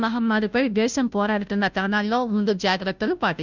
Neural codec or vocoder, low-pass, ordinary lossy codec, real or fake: codec, 16 kHz, 0.5 kbps, FunCodec, trained on Chinese and English, 25 frames a second; 7.2 kHz; none; fake